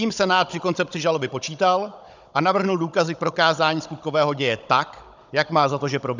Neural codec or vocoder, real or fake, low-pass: codec, 16 kHz, 16 kbps, FunCodec, trained on Chinese and English, 50 frames a second; fake; 7.2 kHz